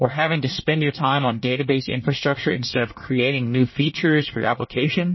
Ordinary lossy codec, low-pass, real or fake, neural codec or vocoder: MP3, 24 kbps; 7.2 kHz; fake; codec, 24 kHz, 1 kbps, SNAC